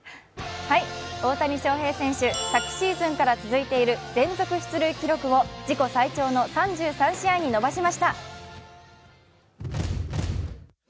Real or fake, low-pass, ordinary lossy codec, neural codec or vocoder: real; none; none; none